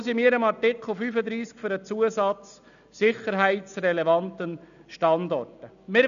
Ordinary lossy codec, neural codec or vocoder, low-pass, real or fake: none; none; 7.2 kHz; real